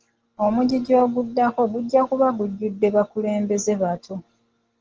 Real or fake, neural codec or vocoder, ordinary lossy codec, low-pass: real; none; Opus, 16 kbps; 7.2 kHz